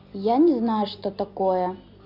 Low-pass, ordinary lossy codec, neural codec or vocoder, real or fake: 5.4 kHz; none; none; real